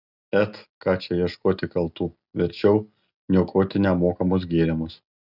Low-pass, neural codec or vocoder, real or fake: 5.4 kHz; none; real